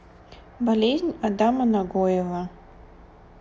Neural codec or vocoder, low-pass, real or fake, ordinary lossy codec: none; none; real; none